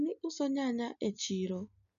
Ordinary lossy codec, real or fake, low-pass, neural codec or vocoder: none; real; 7.2 kHz; none